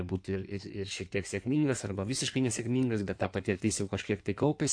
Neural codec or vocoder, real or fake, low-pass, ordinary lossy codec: codec, 16 kHz in and 24 kHz out, 1.1 kbps, FireRedTTS-2 codec; fake; 9.9 kHz; AAC, 64 kbps